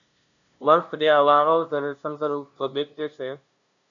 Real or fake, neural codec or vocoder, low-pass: fake; codec, 16 kHz, 0.5 kbps, FunCodec, trained on LibriTTS, 25 frames a second; 7.2 kHz